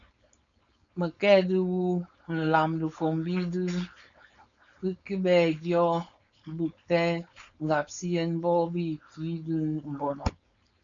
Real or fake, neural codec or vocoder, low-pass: fake; codec, 16 kHz, 4.8 kbps, FACodec; 7.2 kHz